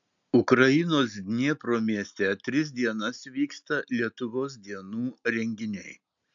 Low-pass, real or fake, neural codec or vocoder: 7.2 kHz; real; none